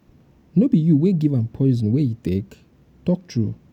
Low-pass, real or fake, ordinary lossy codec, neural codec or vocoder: 19.8 kHz; real; none; none